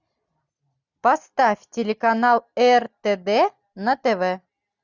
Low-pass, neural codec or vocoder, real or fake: 7.2 kHz; none; real